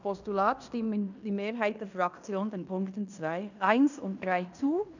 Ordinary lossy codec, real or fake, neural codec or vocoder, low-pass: none; fake; codec, 16 kHz in and 24 kHz out, 0.9 kbps, LongCat-Audio-Codec, fine tuned four codebook decoder; 7.2 kHz